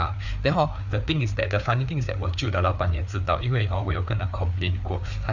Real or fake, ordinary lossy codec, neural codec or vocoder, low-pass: fake; none; codec, 16 kHz, 4 kbps, FreqCodec, larger model; 7.2 kHz